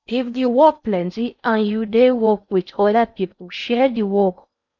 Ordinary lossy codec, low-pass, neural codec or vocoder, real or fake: none; 7.2 kHz; codec, 16 kHz in and 24 kHz out, 0.6 kbps, FocalCodec, streaming, 4096 codes; fake